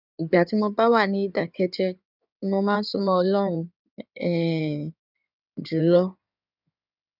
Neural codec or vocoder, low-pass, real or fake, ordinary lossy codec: codec, 16 kHz in and 24 kHz out, 2.2 kbps, FireRedTTS-2 codec; 5.4 kHz; fake; none